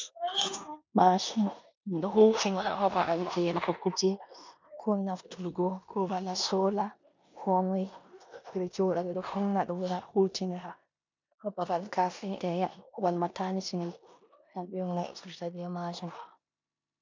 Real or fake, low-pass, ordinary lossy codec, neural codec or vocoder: fake; 7.2 kHz; MP3, 64 kbps; codec, 16 kHz in and 24 kHz out, 0.9 kbps, LongCat-Audio-Codec, four codebook decoder